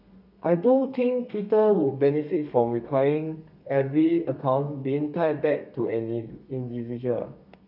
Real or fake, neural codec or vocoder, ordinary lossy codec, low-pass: fake; codec, 32 kHz, 1.9 kbps, SNAC; MP3, 48 kbps; 5.4 kHz